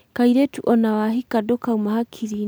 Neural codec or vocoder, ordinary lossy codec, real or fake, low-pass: none; none; real; none